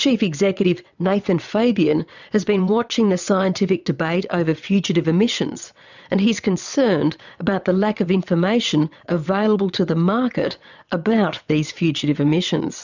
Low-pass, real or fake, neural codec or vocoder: 7.2 kHz; fake; vocoder, 22.05 kHz, 80 mel bands, WaveNeXt